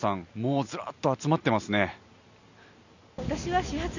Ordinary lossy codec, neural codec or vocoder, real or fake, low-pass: none; none; real; 7.2 kHz